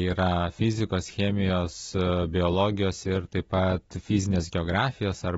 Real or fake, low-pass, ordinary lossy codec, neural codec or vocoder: real; 19.8 kHz; AAC, 24 kbps; none